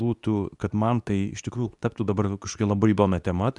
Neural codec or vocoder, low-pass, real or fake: codec, 24 kHz, 0.9 kbps, WavTokenizer, medium speech release version 2; 10.8 kHz; fake